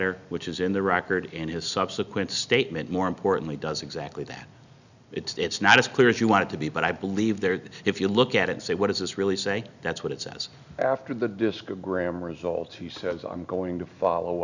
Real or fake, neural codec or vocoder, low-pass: real; none; 7.2 kHz